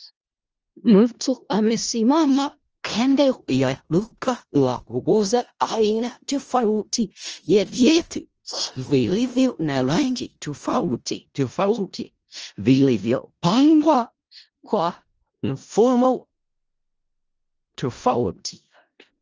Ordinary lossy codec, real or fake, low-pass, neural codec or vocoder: Opus, 24 kbps; fake; 7.2 kHz; codec, 16 kHz in and 24 kHz out, 0.4 kbps, LongCat-Audio-Codec, four codebook decoder